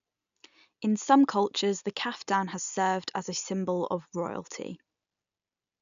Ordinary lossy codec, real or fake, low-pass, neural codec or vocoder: none; real; 7.2 kHz; none